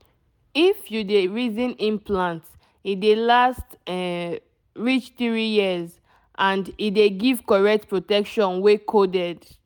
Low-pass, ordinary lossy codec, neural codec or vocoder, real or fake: 19.8 kHz; none; none; real